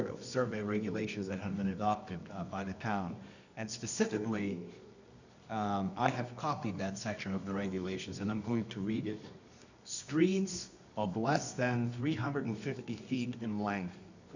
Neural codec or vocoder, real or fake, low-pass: codec, 24 kHz, 0.9 kbps, WavTokenizer, medium music audio release; fake; 7.2 kHz